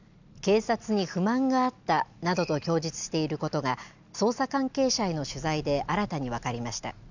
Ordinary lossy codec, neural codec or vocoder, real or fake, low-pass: none; none; real; 7.2 kHz